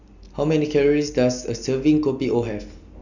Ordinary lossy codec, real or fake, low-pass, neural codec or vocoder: none; real; 7.2 kHz; none